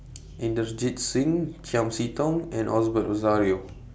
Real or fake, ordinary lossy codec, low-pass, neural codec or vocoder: real; none; none; none